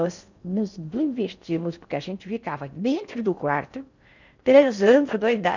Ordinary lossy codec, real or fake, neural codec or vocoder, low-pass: none; fake; codec, 16 kHz in and 24 kHz out, 0.6 kbps, FocalCodec, streaming, 4096 codes; 7.2 kHz